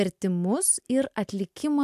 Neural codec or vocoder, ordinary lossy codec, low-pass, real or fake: none; AAC, 96 kbps; 14.4 kHz; real